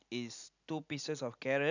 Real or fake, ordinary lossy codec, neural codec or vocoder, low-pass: real; none; none; 7.2 kHz